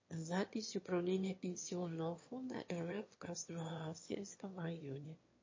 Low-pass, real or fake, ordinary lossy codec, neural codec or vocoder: 7.2 kHz; fake; MP3, 32 kbps; autoencoder, 22.05 kHz, a latent of 192 numbers a frame, VITS, trained on one speaker